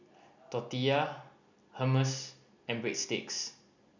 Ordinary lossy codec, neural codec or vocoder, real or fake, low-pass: none; none; real; 7.2 kHz